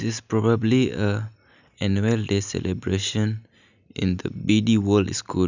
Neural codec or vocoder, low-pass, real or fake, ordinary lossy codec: none; 7.2 kHz; real; none